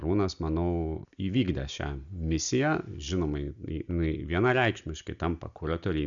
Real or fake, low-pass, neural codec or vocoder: real; 7.2 kHz; none